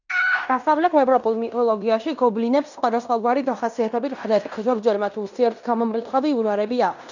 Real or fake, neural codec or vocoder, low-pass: fake; codec, 16 kHz in and 24 kHz out, 0.9 kbps, LongCat-Audio-Codec, fine tuned four codebook decoder; 7.2 kHz